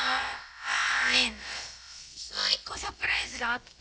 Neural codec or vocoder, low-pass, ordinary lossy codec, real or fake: codec, 16 kHz, about 1 kbps, DyCAST, with the encoder's durations; none; none; fake